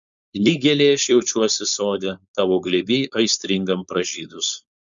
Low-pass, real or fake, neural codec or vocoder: 7.2 kHz; fake; codec, 16 kHz, 4.8 kbps, FACodec